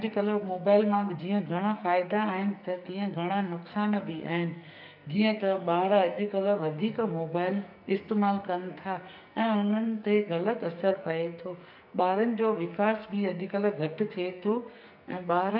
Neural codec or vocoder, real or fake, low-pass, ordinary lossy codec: codec, 44.1 kHz, 2.6 kbps, SNAC; fake; 5.4 kHz; none